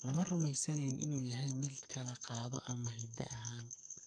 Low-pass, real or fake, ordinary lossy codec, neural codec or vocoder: 9.9 kHz; fake; none; codec, 44.1 kHz, 2.6 kbps, SNAC